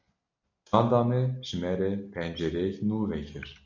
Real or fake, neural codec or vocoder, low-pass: real; none; 7.2 kHz